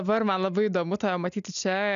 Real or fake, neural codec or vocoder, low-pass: real; none; 7.2 kHz